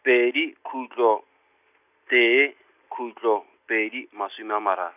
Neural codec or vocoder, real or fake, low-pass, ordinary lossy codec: none; real; 3.6 kHz; none